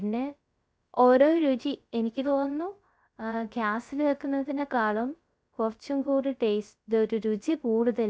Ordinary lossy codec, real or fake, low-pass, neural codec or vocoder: none; fake; none; codec, 16 kHz, 0.3 kbps, FocalCodec